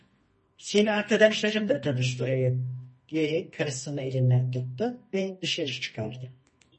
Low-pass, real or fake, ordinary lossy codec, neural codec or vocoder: 10.8 kHz; fake; MP3, 32 kbps; codec, 24 kHz, 0.9 kbps, WavTokenizer, medium music audio release